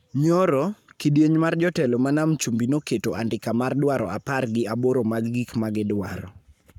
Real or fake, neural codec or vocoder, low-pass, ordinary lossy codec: fake; codec, 44.1 kHz, 7.8 kbps, Pupu-Codec; 19.8 kHz; none